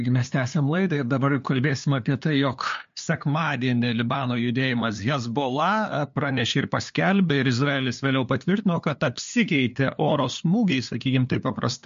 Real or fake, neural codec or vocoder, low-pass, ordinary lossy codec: fake; codec, 16 kHz, 2 kbps, FunCodec, trained on LibriTTS, 25 frames a second; 7.2 kHz; MP3, 48 kbps